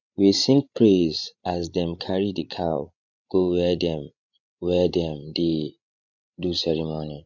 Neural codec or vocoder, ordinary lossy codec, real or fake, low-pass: codec, 16 kHz, 16 kbps, FreqCodec, larger model; none; fake; 7.2 kHz